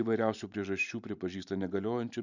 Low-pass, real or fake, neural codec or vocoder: 7.2 kHz; fake; vocoder, 44.1 kHz, 128 mel bands every 512 samples, BigVGAN v2